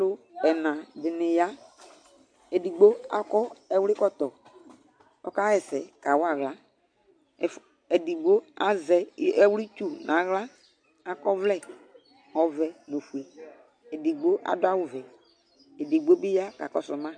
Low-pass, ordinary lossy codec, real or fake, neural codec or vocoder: 9.9 kHz; MP3, 64 kbps; real; none